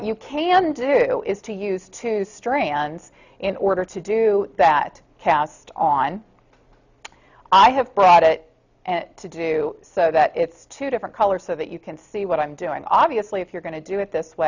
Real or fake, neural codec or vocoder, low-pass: real; none; 7.2 kHz